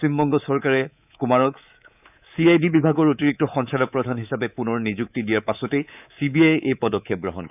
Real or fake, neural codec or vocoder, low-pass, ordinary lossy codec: fake; codec, 24 kHz, 3.1 kbps, DualCodec; 3.6 kHz; none